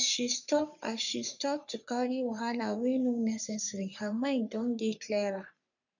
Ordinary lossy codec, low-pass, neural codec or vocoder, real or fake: none; 7.2 kHz; codec, 44.1 kHz, 3.4 kbps, Pupu-Codec; fake